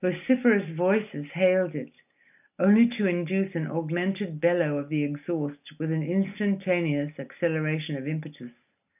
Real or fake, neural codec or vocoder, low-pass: real; none; 3.6 kHz